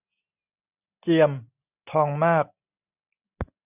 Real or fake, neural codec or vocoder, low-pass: real; none; 3.6 kHz